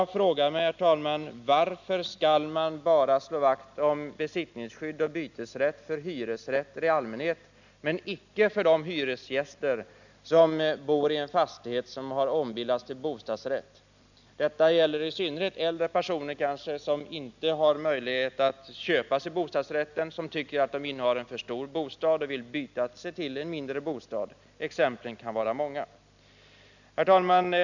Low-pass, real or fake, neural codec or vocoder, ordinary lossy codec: 7.2 kHz; real; none; none